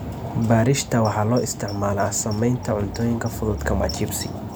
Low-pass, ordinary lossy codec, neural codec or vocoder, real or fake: none; none; none; real